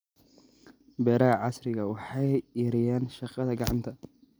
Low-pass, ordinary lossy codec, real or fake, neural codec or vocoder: none; none; real; none